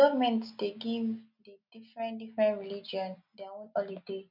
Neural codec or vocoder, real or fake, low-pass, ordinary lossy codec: none; real; 5.4 kHz; none